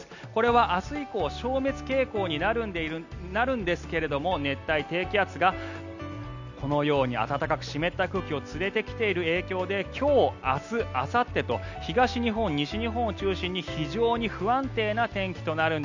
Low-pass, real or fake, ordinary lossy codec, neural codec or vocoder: 7.2 kHz; real; none; none